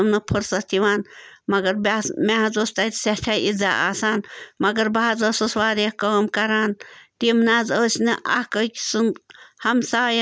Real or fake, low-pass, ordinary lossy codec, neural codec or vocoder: real; none; none; none